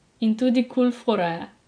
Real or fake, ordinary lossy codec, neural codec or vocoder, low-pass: fake; none; vocoder, 24 kHz, 100 mel bands, Vocos; 9.9 kHz